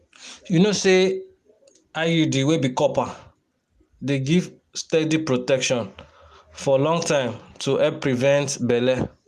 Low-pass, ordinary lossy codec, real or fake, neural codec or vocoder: 14.4 kHz; Opus, 32 kbps; real; none